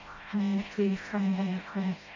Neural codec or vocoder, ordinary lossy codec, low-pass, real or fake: codec, 16 kHz, 0.5 kbps, FreqCodec, smaller model; MP3, 32 kbps; 7.2 kHz; fake